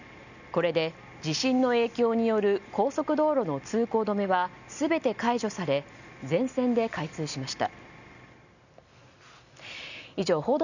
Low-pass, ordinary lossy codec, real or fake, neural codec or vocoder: 7.2 kHz; none; real; none